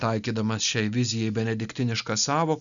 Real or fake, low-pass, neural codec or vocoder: real; 7.2 kHz; none